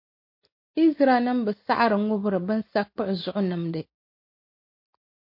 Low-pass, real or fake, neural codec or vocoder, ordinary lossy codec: 5.4 kHz; real; none; MP3, 32 kbps